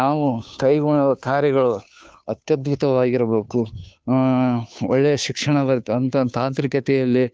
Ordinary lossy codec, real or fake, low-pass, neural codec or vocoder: none; fake; none; codec, 16 kHz, 2 kbps, FunCodec, trained on Chinese and English, 25 frames a second